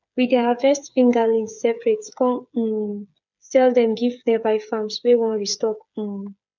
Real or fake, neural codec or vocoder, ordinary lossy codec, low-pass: fake; codec, 16 kHz, 8 kbps, FreqCodec, smaller model; none; 7.2 kHz